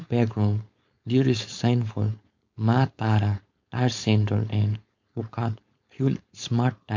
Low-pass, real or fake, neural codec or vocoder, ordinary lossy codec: 7.2 kHz; fake; codec, 16 kHz, 4.8 kbps, FACodec; MP3, 48 kbps